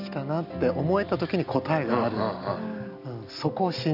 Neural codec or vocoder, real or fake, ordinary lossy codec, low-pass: none; real; none; 5.4 kHz